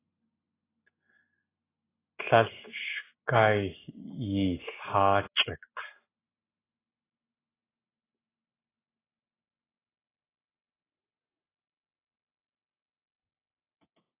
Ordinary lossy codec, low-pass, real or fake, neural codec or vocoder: AAC, 16 kbps; 3.6 kHz; real; none